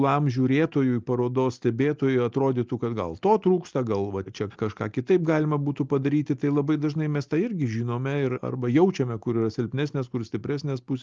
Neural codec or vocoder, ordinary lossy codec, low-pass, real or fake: none; Opus, 24 kbps; 7.2 kHz; real